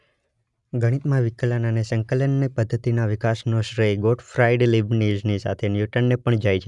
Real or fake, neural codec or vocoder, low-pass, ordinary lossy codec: real; none; 10.8 kHz; none